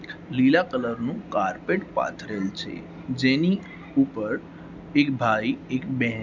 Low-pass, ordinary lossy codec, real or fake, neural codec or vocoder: 7.2 kHz; none; real; none